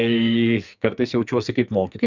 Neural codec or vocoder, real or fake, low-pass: codec, 44.1 kHz, 2.6 kbps, SNAC; fake; 7.2 kHz